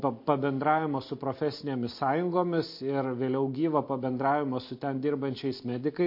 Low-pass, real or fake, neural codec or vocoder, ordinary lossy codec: 5.4 kHz; real; none; MP3, 32 kbps